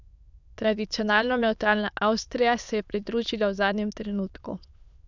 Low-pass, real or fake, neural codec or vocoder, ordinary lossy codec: 7.2 kHz; fake; autoencoder, 22.05 kHz, a latent of 192 numbers a frame, VITS, trained on many speakers; none